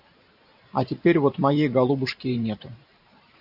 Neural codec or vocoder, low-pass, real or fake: vocoder, 44.1 kHz, 128 mel bands every 512 samples, BigVGAN v2; 5.4 kHz; fake